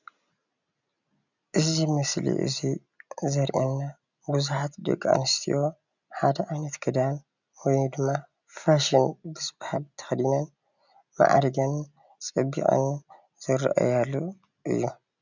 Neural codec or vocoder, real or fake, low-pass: none; real; 7.2 kHz